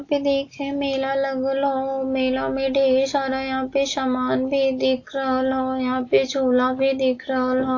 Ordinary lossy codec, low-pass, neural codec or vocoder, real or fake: AAC, 48 kbps; 7.2 kHz; none; real